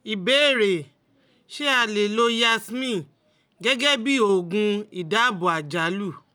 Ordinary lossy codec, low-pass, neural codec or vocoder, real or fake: none; none; none; real